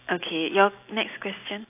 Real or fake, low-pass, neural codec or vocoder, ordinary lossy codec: real; 3.6 kHz; none; AAC, 24 kbps